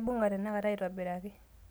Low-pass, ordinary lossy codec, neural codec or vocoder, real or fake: none; none; none; real